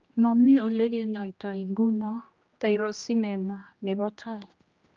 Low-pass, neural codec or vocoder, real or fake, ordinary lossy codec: 7.2 kHz; codec, 16 kHz, 1 kbps, X-Codec, HuBERT features, trained on general audio; fake; Opus, 24 kbps